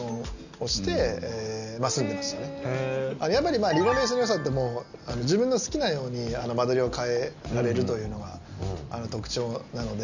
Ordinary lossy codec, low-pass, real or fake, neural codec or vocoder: none; 7.2 kHz; real; none